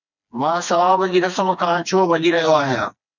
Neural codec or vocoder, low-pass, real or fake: codec, 16 kHz, 2 kbps, FreqCodec, smaller model; 7.2 kHz; fake